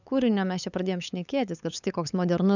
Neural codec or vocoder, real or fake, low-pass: codec, 16 kHz, 8 kbps, FunCodec, trained on LibriTTS, 25 frames a second; fake; 7.2 kHz